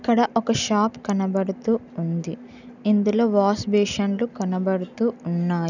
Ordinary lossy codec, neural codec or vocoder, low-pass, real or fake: none; none; 7.2 kHz; real